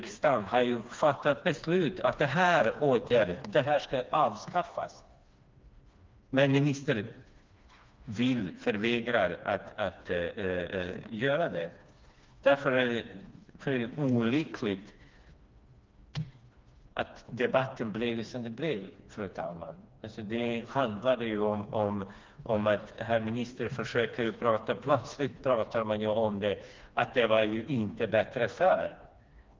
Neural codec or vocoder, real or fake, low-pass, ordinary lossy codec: codec, 16 kHz, 2 kbps, FreqCodec, smaller model; fake; 7.2 kHz; Opus, 24 kbps